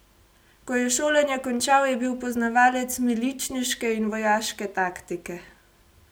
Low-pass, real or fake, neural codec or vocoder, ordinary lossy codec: none; real; none; none